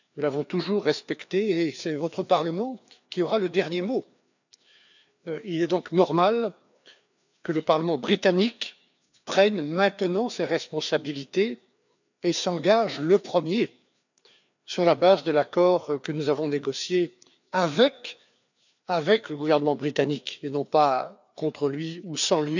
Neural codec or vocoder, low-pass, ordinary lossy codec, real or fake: codec, 16 kHz, 2 kbps, FreqCodec, larger model; 7.2 kHz; none; fake